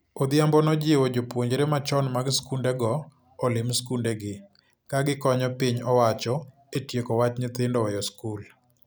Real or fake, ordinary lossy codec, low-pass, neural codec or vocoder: real; none; none; none